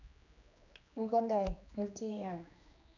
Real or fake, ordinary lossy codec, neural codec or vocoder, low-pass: fake; none; codec, 16 kHz, 2 kbps, X-Codec, HuBERT features, trained on general audio; 7.2 kHz